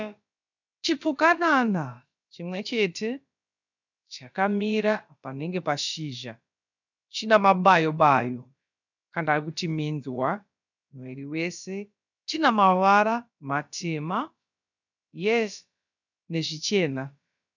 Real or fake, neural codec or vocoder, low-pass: fake; codec, 16 kHz, about 1 kbps, DyCAST, with the encoder's durations; 7.2 kHz